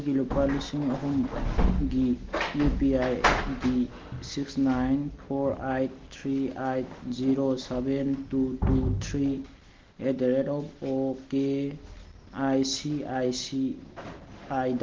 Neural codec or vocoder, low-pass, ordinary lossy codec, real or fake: none; 7.2 kHz; Opus, 16 kbps; real